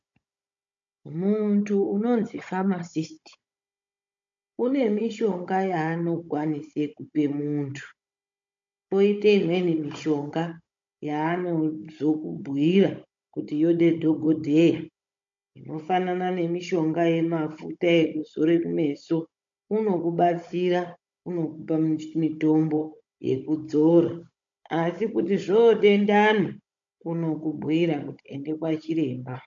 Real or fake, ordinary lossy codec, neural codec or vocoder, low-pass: fake; MP3, 48 kbps; codec, 16 kHz, 16 kbps, FunCodec, trained on Chinese and English, 50 frames a second; 7.2 kHz